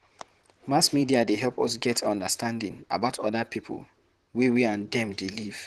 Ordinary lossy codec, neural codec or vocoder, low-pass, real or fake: Opus, 16 kbps; vocoder, 44.1 kHz, 128 mel bands, Pupu-Vocoder; 14.4 kHz; fake